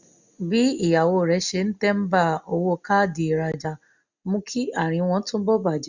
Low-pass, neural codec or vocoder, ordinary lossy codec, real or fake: 7.2 kHz; none; none; real